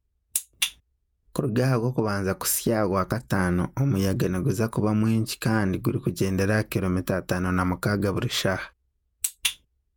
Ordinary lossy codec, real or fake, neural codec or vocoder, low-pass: none; fake; vocoder, 48 kHz, 128 mel bands, Vocos; none